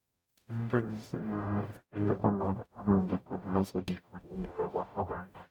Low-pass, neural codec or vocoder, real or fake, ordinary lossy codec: 19.8 kHz; codec, 44.1 kHz, 0.9 kbps, DAC; fake; none